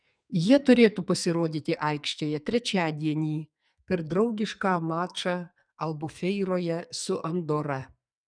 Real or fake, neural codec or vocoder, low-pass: fake; codec, 44.1 kHz, 2.6 kbps, SNAC; 9.9 kHz